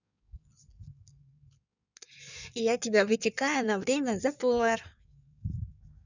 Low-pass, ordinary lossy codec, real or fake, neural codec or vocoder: 7.2 kHz; none; fake; codec, 16 kHz in and 24 kHz out, 1.1 kbps, FireRedTTS-2 codec